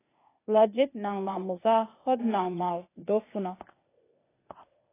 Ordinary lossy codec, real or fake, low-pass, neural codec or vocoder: AAC, 16 kbps; fake; 3.6 kHz; codec, 16 kHz, 0.8 kbps, ZipCodec